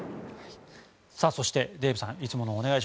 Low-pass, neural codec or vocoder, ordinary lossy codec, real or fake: none; none; none; real